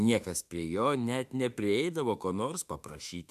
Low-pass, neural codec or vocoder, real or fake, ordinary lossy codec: 14.4 kHz; autoencoder, 48 kHz, 32 numbers a frame, DAC-VAE, trained on Japanese speech; fake; MP3, 96 kbps